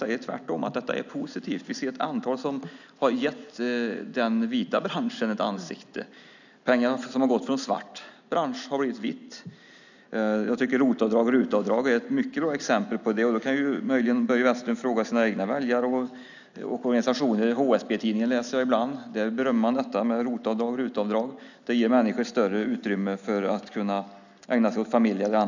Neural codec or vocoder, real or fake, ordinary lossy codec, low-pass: none; real; none; 7.2 kHz